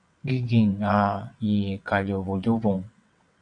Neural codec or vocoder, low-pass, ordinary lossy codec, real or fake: vocoder, 22.05 kHz, 80 mel bands, WaveNeXt; 9.9 kHz; AAC, 64 kbps; fake